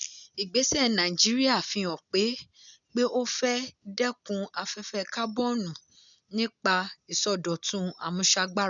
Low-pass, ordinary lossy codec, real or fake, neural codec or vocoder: 7.2 kHz; none; real; none